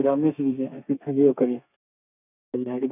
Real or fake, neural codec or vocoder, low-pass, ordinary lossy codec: fake; codec, 32 kHz, 1.9 kbps, SNAC; 3.6 kHz; none